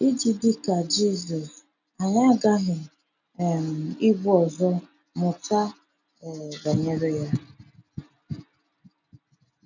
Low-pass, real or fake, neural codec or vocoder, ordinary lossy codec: 7.2 kHz; real; none; none